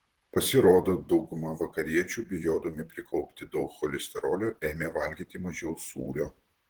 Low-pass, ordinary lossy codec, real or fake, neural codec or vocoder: 19.8 kHz; Opus, 16 kbps; fake; vocoder, 44.1 kHz, 128 mel bands, Pupu-Vocoder